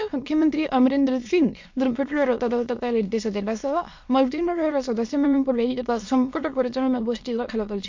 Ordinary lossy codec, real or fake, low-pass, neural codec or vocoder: MP3, 48 kbps; fake; 7.2 kHz; autoencoder, 22.05 kHz, a latent of 192 numbers a frame, VITS, trained on many speakers